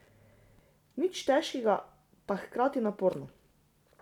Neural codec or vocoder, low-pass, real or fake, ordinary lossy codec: none; 19.8 kHz; real; none